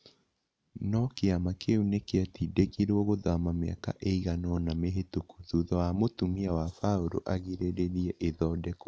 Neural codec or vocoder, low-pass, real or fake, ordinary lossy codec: none; none; real; none